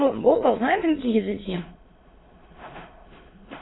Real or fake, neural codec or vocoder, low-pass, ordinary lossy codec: fake; autoencoder, 22.05 kHz, a latent of 192 numbers a frame, VITS, trained on many speakers; 7.2 kHz; AAC, 16 kbps